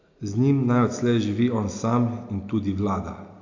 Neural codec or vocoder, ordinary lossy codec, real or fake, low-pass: none; AAC, 48 kbps; real; 7.2 kHz